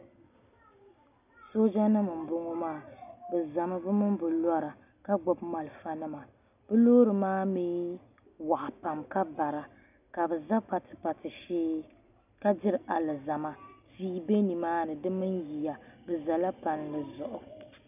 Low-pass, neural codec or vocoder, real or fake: 3.6 kHz; none; real